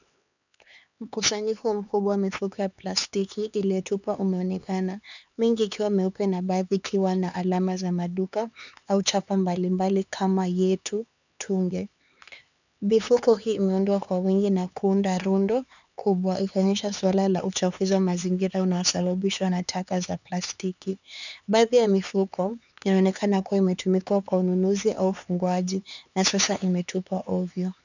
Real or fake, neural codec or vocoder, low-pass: fake; codec, 16 kHz, 4 kbps, X-Codec, HuBERT features, trained on LibriSpeech; 7.2 kHz